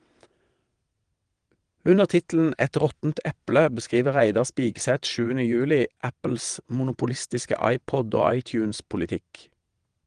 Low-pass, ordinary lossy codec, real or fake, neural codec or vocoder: 9.9 kHz; Opus, 24 kbps; fake; vocoder, 22.05 kHz, 80 mel bands, WaveNeXt